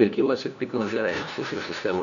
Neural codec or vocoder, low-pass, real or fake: codec, 16 kHz, 1 kbps, FunCodec, trained on LibriTTS, 50 frames a second; 7.2 kHz; fake